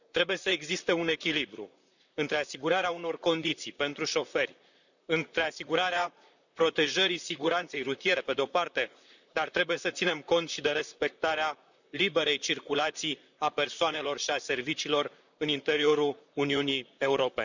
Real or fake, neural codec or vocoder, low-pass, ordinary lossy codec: fake; vocoder, 44.1 kHz, 128 mel bands, Pupu-Vocoder; 7.2 kHz; none